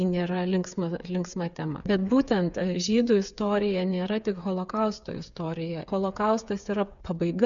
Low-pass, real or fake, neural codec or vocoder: 7.2 kHz; fake; codec, 16 kHz, 8 kbps, FreqCodec, smaller model